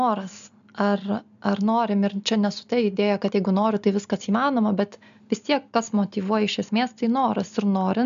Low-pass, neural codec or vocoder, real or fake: 7.2 kHz; none; real